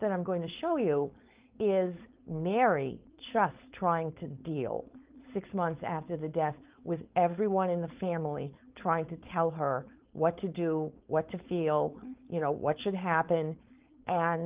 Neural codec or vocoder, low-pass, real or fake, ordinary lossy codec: codec, 16 kHz, 4.8 kbps, FACodec; 3.6 kHz; fake; Opus, 32 kbps